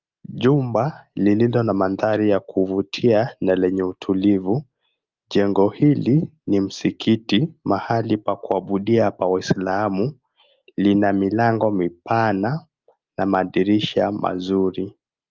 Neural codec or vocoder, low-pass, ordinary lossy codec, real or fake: none; 7.2 kHz; Opus, 24 kbps; real